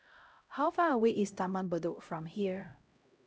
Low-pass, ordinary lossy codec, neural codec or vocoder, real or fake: none; none; codec, 16 kHz, 0.5 kbps, X-Codec, HuBERT features, trained on LibriSpeech; fake